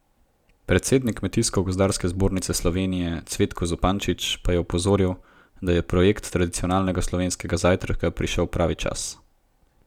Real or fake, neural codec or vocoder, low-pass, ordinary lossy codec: real; none; 19.8 kHz; none